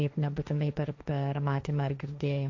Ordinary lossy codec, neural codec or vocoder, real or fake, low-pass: MP3, 48 kbps; codec, 16 kHz, 1.1 kbps, Voila-Tokenizer; fake; 7.2 kHz